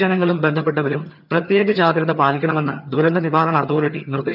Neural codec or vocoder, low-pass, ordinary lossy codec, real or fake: vocoder, 22.05 kHz, 80 mel bands, HiFi-GAN; 5.4 kHz; none; fake